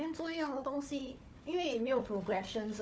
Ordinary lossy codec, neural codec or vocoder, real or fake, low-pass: none; codec, 16 kHz, 4 kbps, FunCodec, trained on Chinese and English, 50 frames a second; fake; none